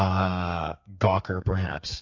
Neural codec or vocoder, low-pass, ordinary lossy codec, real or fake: codec, 32 kHz, 1.9 kbps, SNAC; 7.2 kHz; AAC, 48 kbps; fake